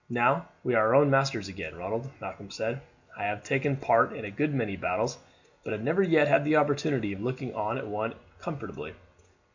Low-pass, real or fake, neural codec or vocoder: 7.2 kHz; real; none